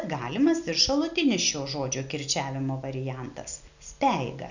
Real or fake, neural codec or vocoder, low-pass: real; none; 7.2 kHz